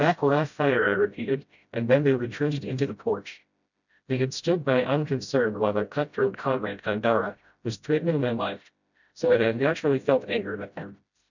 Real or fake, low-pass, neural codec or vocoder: fake; 7.2 kHz; codec, 16 kHz, 0.5 kbps, FreqCodec, smaller model